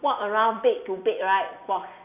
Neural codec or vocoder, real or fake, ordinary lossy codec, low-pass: none; real; none; 3.6 kHz